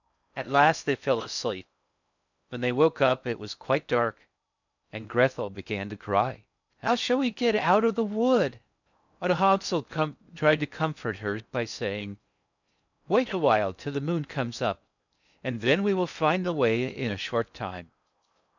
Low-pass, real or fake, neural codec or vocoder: 7.2 kHz; fake; codec, 16 kHz in and 24 kHz out, 0.6 kbps, FocalCodec, streaming, 4096 codes